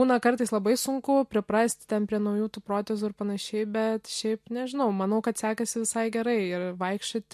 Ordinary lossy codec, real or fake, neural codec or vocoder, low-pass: MP3, 64 kbps; real; none; 14.4 kHz